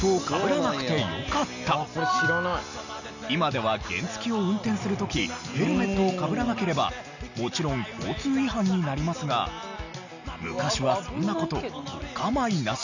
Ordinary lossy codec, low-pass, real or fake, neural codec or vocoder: none; 7.2 kHz; real; none